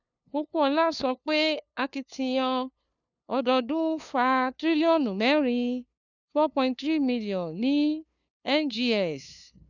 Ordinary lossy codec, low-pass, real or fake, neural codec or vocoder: none; 7.2 kHz; fake; codec, 16 kHz, 2 kbps, FunCodec, trained on LibriTTS, 25 frames a second